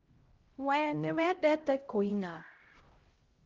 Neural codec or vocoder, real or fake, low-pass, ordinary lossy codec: codec, 16 kHz, 0.5 kbps, X-Codec, HuBERT features, trained on LibriSpeech; fake; 7.2 kHz; Opus, 16 kbps